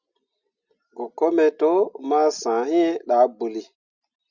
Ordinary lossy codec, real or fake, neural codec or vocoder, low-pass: Opus, 64 kbps; real; none; 7.2 kHz